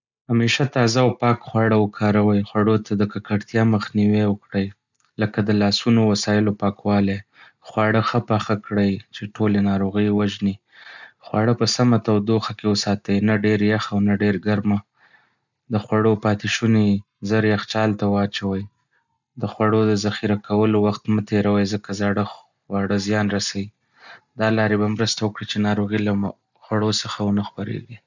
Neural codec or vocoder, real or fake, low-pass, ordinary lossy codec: none; real; 7.2 kHz; none